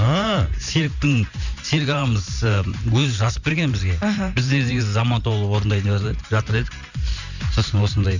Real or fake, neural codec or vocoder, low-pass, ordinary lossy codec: fake; vocoder, 44.1 kHz, 128 mel bands every 512 samples, BigVGAN v2; 7.2 kHz; none